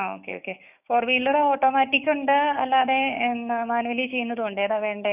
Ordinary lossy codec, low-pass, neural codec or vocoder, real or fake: none; 3.6 kHz; codec, 16 kHz, 6 kbps, DAC; fake